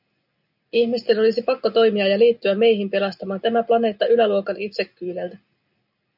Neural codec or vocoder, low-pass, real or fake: none; 5.4 kHz; real